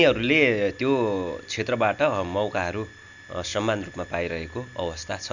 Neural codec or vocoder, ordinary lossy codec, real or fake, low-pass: none; none; real; 7.2 kHz